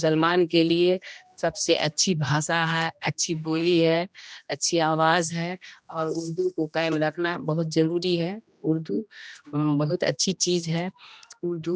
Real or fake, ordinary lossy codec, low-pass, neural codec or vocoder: fake; none; none; codec, 16 kHz, 1 kbps, X-Codec, HuBERT features, trained on general audio